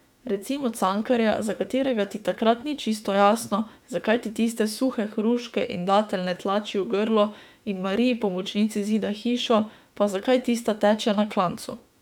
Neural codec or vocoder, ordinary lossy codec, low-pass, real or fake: autoencoder, 48 kHz, 32 numbers a frame, DAC-VAE, trained on Japanese speech; none; 19.8 kHz; fake